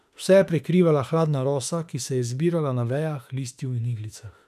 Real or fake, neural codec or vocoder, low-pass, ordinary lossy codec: fake; autoencoder, 48 kHz, 32 numbers a frame, DAC-VAE, trained on Japanese speech; 14.4 kHz; none